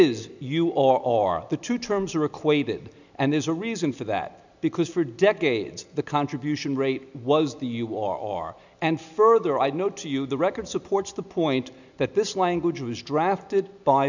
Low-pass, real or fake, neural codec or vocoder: 7.2 kHz; real; none